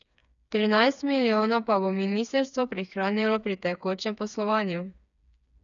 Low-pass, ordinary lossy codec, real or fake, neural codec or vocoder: 7.2 kHz; none; fake; codec, 16 kHz, 4 kbps, FreqCodec, smaller model